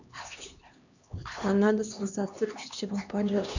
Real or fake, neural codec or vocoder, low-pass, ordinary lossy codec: fake; codec, 16 kHz, 2 kbps, X-Codec, HuBERT features, trained on LibriSpeech; 7.2 kHz; none